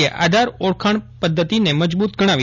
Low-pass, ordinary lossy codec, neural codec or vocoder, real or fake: 7.2 kHz; none; none; real